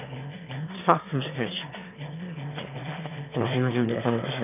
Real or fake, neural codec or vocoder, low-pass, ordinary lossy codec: fake; autoencoder, 22.05 kHz, a latent of 192 numbers a frame, VITS, trained on one speaker; 3.6 kHz; none